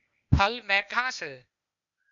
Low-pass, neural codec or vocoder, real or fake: 7.2 kHz; codec, 16 kHz, 0.8 kbps, ZipCodec; fake